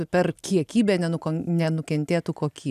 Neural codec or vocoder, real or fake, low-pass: none; real; 14.4 kHz